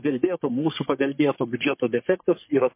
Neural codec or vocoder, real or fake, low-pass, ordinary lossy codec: codec, 44.1 kHz, 3.4 kbps, Pupu-Codec; fake; 3.6 kHz; MP3, 24 kbps